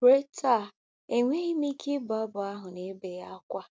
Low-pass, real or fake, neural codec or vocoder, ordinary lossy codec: none; real; none; none